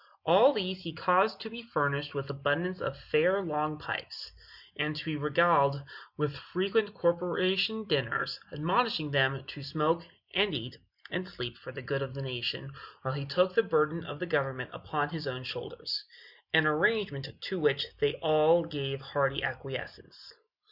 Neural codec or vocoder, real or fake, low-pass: none; real; 5.4 kHz